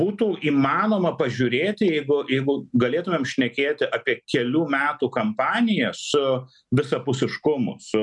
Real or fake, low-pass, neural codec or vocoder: real; 10.8 kHz; none